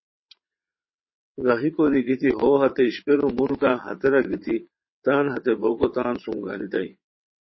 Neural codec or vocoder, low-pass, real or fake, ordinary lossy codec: vocoder, 22.05 kHz, 80 mel bands, WaveNeXt; 7.2 kHz; fake; MP3, 24 kbps